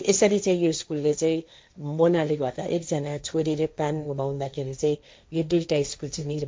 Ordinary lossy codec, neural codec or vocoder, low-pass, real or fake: none; codec, 16 kHz, 1.1 kbps, Voila-Tokenizer; none; fake